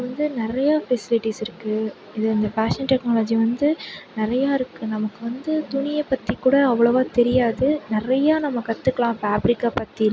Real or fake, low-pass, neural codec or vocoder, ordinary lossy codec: real; none; none; none